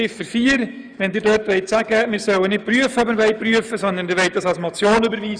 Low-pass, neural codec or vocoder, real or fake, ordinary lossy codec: 9.9 kHz; autoencoder, 48 kHz, 128 numbers a frame, DAC-VAE, trained on Japanese speech; fake; none